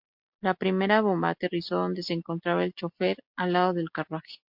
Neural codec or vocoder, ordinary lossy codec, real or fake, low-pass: none; MP3, 48 kbps; real; 5.4 kHz